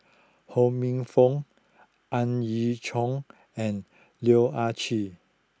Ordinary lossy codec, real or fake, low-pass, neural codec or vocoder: none; real; none; none